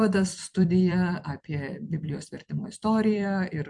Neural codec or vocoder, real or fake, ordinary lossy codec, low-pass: vocoder, 44.1 kHz, 128 mel bands every 256 samples, BigVGAN v2; fake; MP3, 64 kbps; 10.8 kHz